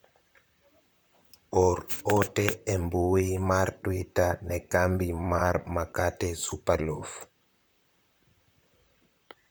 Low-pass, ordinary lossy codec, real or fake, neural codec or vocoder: none; none; fake; vocoder, 44.1 kHz, 128 mel bands, Pupu-Vocoder